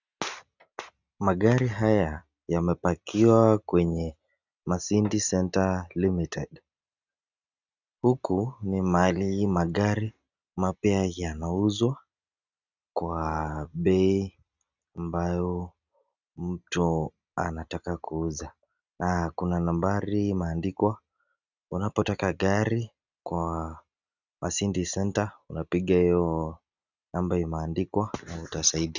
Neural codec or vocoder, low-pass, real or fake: none; 7.2 kHz; real